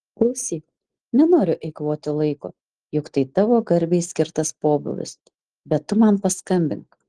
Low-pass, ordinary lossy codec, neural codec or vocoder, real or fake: 9.9 kHz; Opus, 16 kbps; none; real